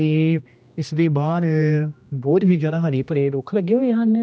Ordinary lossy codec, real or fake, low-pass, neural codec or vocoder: none; fake; none; codec, 16 kHz, 1 kbps, X-Codec, HuBERT features, trained on general audio